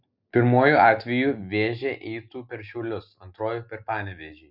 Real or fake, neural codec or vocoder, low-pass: real; none; 5.4 kHz